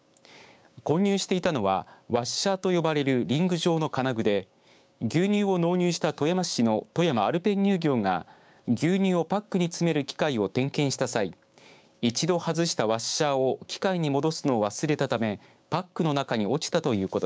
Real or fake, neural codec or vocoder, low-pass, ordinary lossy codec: fake; codec, 16 kHz, 6 kbps, DAC; none; none